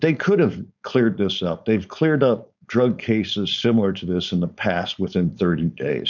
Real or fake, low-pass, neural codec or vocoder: fake; 7.2 kHz; codec, 16 kHz, 4.8 kbps, FACodec